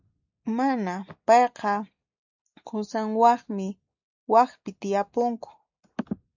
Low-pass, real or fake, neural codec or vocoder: 7.2 kHz; real; none